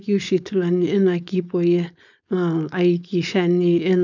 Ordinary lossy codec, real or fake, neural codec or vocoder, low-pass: none; fake; codec, 16 kHz, 4.8 kbps, FACodec; 7.2 kHz